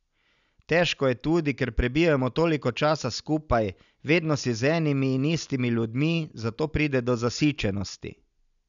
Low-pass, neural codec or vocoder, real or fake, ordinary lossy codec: 7.2 kHz; none; real; none